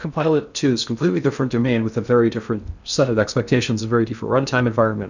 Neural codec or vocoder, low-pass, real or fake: codec, 16 kHz in and 24 kHz out, 0.6 kbps, FocalCodec, streaming, 2048 codes; 7.2 kHz; fake